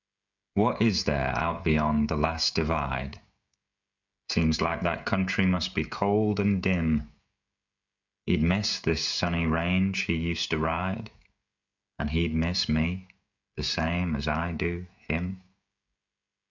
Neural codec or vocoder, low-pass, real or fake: codec, 16 kHz, 16 kbps, FreqCodec, smaller model; 7.2 kHz; fake